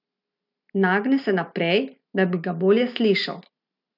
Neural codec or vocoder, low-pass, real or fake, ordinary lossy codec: none; 5.4 kHz; real; none